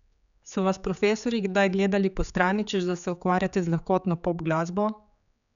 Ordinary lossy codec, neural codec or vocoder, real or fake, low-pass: none; codec, 16 kHz, 4 kbps, X-Codec, HuBERT features, trained on general audio; fake; 7.2 kHz